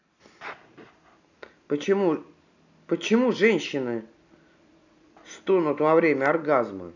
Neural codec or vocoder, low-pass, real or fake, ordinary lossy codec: none; 7.2 kHz; real; none